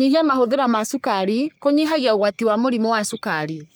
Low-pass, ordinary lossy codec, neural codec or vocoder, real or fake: none; none; codec, 44.1 kHz, 3.4 kbps, Pupu-Codec; fake